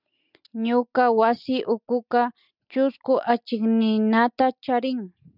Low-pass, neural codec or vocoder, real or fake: 5.4 kHz; none; real